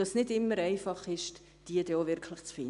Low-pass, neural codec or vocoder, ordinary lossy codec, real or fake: 10.8 kHz; none; none; real